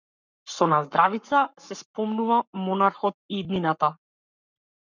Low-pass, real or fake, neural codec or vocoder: 7.2 kHz; fake; codec, 44.1 kHz, 7.8 kbps, Pupu-Codec